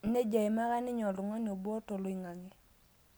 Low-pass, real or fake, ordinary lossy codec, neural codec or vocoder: none; real; none; none